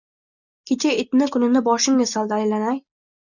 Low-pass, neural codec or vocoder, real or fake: 7.2 kHz; none; real